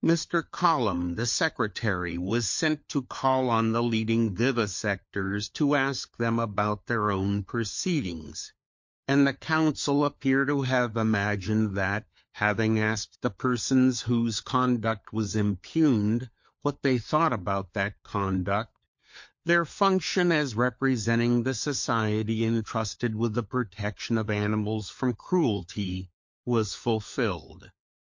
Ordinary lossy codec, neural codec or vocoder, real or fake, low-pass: MP3, 48 kbps; codec, 16 kHz, 4 kbps, FunCodec, trained on LibriTTS, 50 frames a second; fake; 7.2 kHz